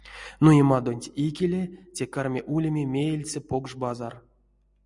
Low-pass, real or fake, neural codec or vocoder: 10.8 kHz; real; none